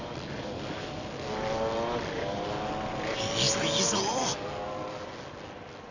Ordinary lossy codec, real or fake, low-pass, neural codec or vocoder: none; real; 7.2 kHz; none